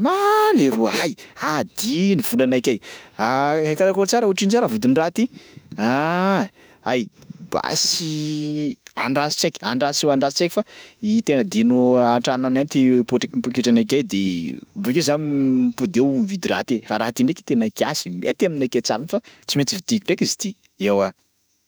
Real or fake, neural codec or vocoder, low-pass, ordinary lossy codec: fake; autoencoder, 48 kHz, 32 numbers a frame, DAC-VAE, trained on Japanese speech; none; none